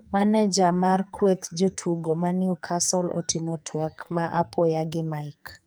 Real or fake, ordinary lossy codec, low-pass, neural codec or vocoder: fake; none; none; codec, 44.1 kHz, 2.6 kbps, SNAC